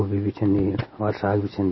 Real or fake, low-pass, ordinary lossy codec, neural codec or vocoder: real; 7.2 kHz; MP3, 24 kbps; none